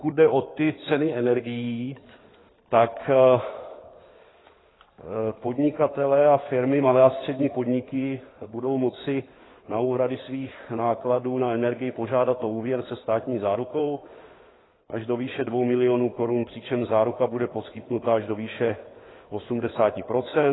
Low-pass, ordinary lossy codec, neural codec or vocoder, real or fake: 7.2 kHz; AAC, 16 kbps; codec, 16 kHz in and 24 kHz out, 2.2 kbps, FireRedTTS-2 codec; fake